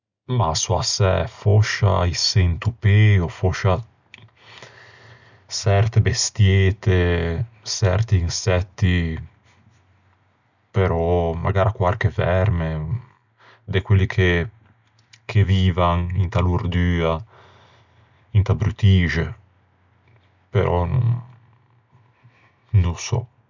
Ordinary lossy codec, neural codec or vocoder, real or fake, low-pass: none; none; real; 7.2 kHz